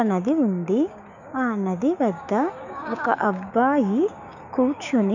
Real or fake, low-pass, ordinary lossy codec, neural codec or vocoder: fake; 7.2 kHz; none; codec, 16 kHz, 6 kbps, DAC